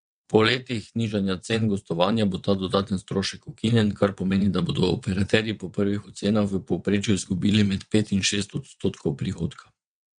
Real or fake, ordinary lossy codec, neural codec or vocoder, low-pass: fake; MP3, 64 kbps; vocoder, 22.05 kHz, 80 mel bands, WaveNeXt; 9.9 kHz